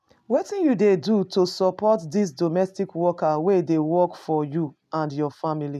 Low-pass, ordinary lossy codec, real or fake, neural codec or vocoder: 14.4 kHz; none; real; none